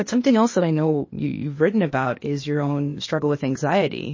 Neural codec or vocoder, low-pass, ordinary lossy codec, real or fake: codec, 16 kHz, 0.8 kbps, ZipCodec; 7.2 kHz; MP3, 32 kbps; fake